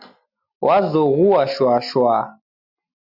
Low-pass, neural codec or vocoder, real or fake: 5.4 kHz; none; real